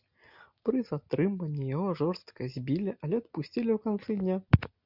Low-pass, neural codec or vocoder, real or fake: 5.4 kHz; none; real